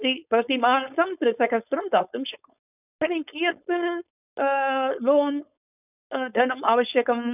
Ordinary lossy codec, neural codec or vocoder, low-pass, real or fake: none; codec, 16 kHz, 4.8 kbps, FACodec; 3.6 kHz; fake